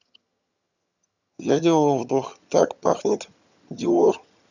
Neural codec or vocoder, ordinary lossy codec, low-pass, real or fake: vocoder, 22.05 kHz, 80 mel bands, HiFi-GAN; none; 7.2 kHz; fake